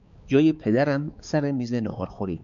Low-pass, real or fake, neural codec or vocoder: 7.2 kHz; fake; codec, 16 kHz, 4 kbps, X-Codec, HuBERT features, trained on balanced general audio